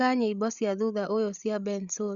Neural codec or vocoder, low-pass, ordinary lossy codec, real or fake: codec, 16 kHz, 8 kbps, FreqCodec, larger model; 7.2 kHz; Opus, 64 kbps; fake